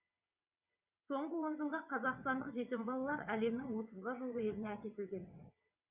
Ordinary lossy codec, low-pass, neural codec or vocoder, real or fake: Opus, 64 kbps; 3.6 kHz; vocoder, 22.05 kHz, 80 mel bands, WaveNeXt; fake